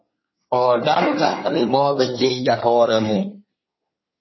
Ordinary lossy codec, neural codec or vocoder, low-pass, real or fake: MP3, 24 kbps; codec, 24 kHz, 1 kbps, SNAC; 7.2 kHz; fake